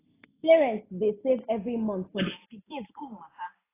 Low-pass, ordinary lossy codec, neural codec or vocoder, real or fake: 3.6 kHz; AAC, 16 kbps; none; real